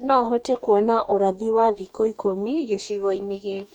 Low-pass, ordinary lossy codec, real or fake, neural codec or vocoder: 19.8 kHz; none; fake; codec, 44.1 kHz, 2.6 kbps, DAC